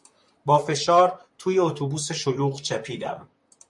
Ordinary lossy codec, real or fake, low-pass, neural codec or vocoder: MP3, 64 kbps; fake; 10.8 kHz; vocoder, 44.1 kHz, 128 mel bands, Pupu-Vocoder